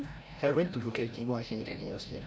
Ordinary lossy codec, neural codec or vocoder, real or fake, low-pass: none; codec, 16 kHz, 0.5 kbps, FreqCodec, larger model; fake; none